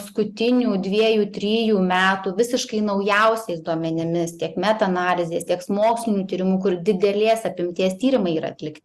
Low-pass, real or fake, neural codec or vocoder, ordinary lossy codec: 14.4 kHz; real; none; Opus, 64 kbps